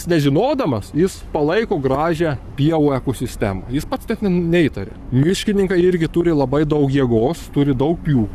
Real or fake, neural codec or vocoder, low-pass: fake; codec, 44.1 kHz, 7.8 kbps, Pupu-Codec; 14.4 kHz